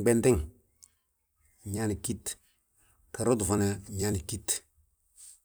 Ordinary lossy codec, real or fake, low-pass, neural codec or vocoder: none; fake; none; vocoder, 44.1 kHz, 128 mel bands every 256 samples, BigVGAN v2